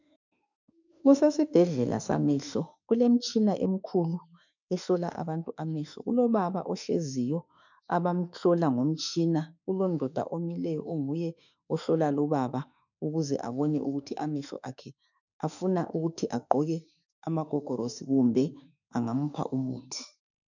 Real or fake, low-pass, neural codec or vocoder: fake; 7.2 kHz; autoencoder, 48 kHz, 32 numbers a frame, DAC-VAE, trained on Japanese speech